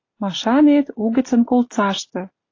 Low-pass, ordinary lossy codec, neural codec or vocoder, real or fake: 7.2 kHz; AAC, 32 kbps; none; real